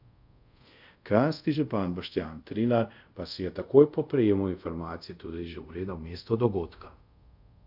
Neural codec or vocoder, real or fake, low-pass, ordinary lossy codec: codec, 24 kHz, 0.5 kbps, DualCodec; fake; 5.4 kHz; none